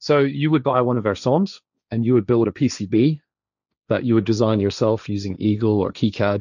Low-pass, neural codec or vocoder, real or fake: 7.2 kHz; codec, 16 kHz, 1.1 kbps, Voila-Tokenizer; fake